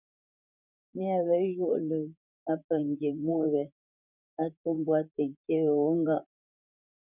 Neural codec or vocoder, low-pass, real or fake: vocoder, 44.1 kHz, 128 mel bands, Pupu-Vocoder; 3.6 kHz; fake